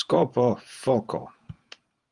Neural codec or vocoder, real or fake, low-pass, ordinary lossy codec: vocoder, 48 kHz, 128 mel bands, Vocos; fake; 10.8 kHz; Opus, 32 kbps